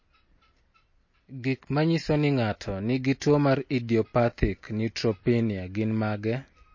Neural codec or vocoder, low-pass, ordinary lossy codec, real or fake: none; 7.2 kHz; MP3, 32 kbps; real